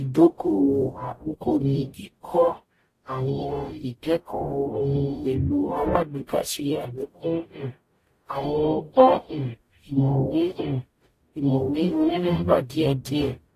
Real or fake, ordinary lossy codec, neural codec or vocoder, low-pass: fake; AAC, 48 kbps; codec, 44.1 kHz, 0.9 kbps, DAC; 14.4 kHz